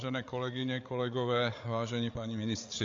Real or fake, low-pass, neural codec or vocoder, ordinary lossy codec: fake; 7.2 kHz; codec, 16 kHz, 8 kbps, FreqCodec, larger model; AAC, 48 kbps